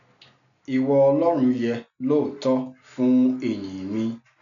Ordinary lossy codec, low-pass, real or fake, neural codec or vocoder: none; 7.2 kHz; real; none